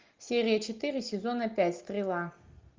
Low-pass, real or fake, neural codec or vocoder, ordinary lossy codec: 7.2 kHz; real; none; Opus, 24 kbps